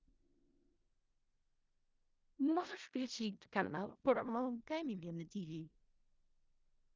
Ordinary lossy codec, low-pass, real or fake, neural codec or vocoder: Opus, 24 kbps; 7.2 kHz; fake; codec, 16 kHz in and 24 kHz out, 0.4 kbps, LongCat-Audio-Codec, four codebook decoder